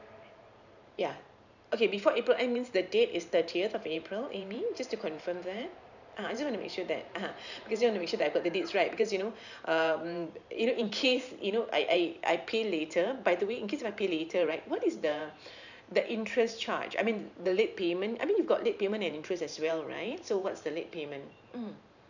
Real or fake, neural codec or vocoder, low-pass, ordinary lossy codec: fake; vocoder, 44.1 kHz, 128 mel bands every 512 samples, BigVGAN v2; 7.2 kHz; none